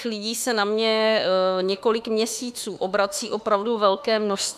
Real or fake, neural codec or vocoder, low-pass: fake; autoencoder, 48 kHz, 32 numbers a frame, DAC-VAE, trained on Japanese speech; 14.4 kHz